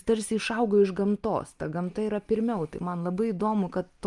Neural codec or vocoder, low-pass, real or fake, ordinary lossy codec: none; 10.8 kHz; real; Opus, 32 kbps